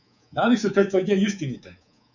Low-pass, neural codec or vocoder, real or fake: 7.2 kHz; codec, 24 kHz, 3.1 kbps, DualCodec; fake